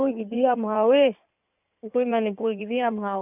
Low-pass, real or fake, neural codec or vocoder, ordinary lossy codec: 3.6 kHz; fake; codec, 16 kHz in and 24 kHz out, 2.2 kbps, FireRedTTS-2 codec; none